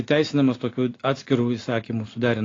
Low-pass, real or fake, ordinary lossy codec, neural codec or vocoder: 7.2 kHz; real; AAC, 32 kbps; none